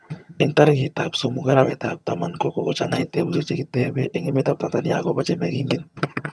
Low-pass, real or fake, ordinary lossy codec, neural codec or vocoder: none; fake; none; vocoder, 22.05 kHz, 80 mel bands, HiFi-GAN